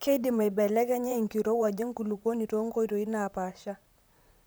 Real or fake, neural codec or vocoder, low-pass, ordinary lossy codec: fake; vocoder, 44.1 kHz, 128 mel bands, Pupu-Vocoder; none; none